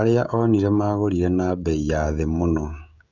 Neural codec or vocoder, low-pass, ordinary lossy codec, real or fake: codec, 16 kHz, 16 kbps, FreqCodec, smaller model; 7.2 kHz; none; fake